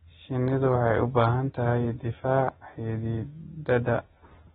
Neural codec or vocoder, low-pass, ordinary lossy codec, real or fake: none; 19.8 kHz; AAC, 16 kbps; real